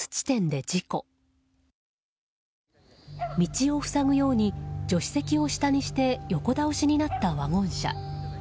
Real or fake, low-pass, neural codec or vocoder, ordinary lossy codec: real; none; none; none